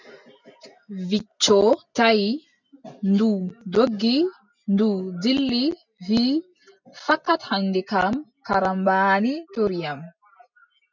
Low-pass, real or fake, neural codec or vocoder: 7.2 kHz; real; none